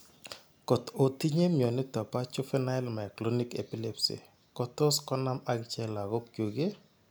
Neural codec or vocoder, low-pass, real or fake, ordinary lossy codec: none; none; real; none